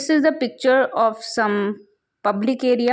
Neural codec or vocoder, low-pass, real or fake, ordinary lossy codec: none; none; real; none